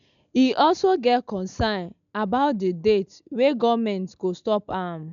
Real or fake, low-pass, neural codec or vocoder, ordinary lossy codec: real; 7.2 kHz; none; none